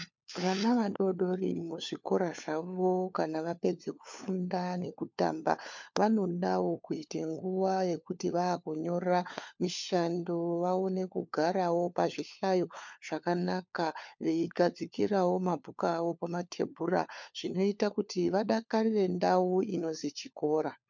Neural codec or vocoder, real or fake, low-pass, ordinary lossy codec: codec, 16 kHz, 4 kbps, FunCodec, trained on LibriTTS, 50 frames a second; fake; 7.2 kHz; MP3, 64 kbps